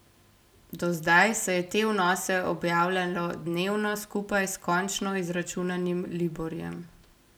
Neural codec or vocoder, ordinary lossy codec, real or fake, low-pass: none; none; real; none